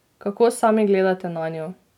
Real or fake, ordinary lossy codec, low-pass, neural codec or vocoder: real; none; 19.8 kHz; none